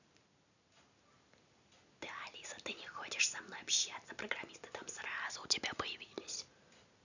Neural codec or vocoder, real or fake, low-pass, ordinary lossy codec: none; real; 7.2 kHz; none